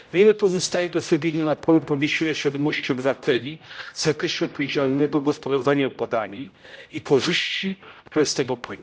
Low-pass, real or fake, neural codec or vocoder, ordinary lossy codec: none; fake; codec, 16 kHz, 0.5 kbps, X-Codec, HuBERT features, trained on general audio; none